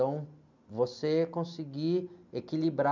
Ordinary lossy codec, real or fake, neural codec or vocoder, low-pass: none; real; none; 7.2 kHz